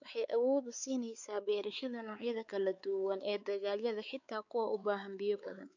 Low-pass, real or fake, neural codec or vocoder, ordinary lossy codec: 7.2 kHz; fake; codec, 16 kHz, 4 kbps, X-Codec, WavLM features, trained on Multilingual LibriSpeech; none